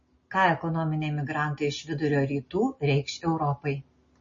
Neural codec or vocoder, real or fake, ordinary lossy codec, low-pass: none; real; MP3, 32 kbps; 7.2 kHz